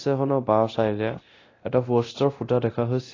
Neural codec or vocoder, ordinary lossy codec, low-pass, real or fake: codec, 24 kHz, 0.9 kbps, DualCodec; AAC, 32 kbps; 7.2 kHz; fake